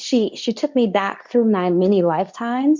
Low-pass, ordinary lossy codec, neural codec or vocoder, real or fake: 7.2 kHz; MP3, 64 kbps; codec, 24 kHz, 0.9 kbps, WavTokenizer, medium speech release version 2; fake